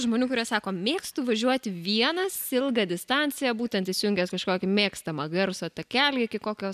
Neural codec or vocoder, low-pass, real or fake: none; 14.4 kHz; real